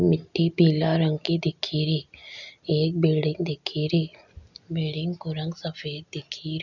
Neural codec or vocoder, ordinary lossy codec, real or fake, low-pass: none; none; real; 7.2 kHz